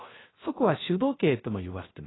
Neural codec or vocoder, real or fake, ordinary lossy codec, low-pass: codec, 16 kHz, 0.3 kbps, FocalCodec; fake; AAC, 16 kbps; 7.2 kHz